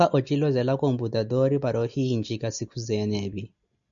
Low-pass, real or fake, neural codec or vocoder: 7.2 kHz; real; none